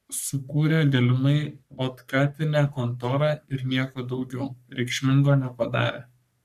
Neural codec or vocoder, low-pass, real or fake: codec, 44.1 kHz, 3.4 kbps, Pupu-Codec; 14.4 kHz; fake